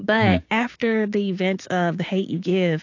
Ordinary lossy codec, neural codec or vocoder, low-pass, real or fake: AAC, 48 kbps; codec, 16 kHz, 6 kbps, DAC; 7.2 kHz; fake